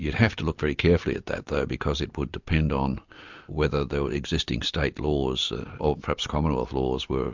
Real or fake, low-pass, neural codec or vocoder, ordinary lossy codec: real; 7.2 kHz; none; MP3, 64 kbps